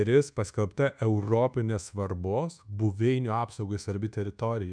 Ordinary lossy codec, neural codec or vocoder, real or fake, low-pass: MP3, 96 kbps; codec, 24 kHz, 1.2 kbps, DualCodec; fake; 9.9 kHz